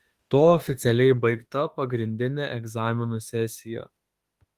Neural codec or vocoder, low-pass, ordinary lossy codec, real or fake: autoencoder, 48 kHz, 32 numbers a frame, DAC-VAE, trained on Japanese speech; 14.4 kHz; Opus, 24 kbps; fake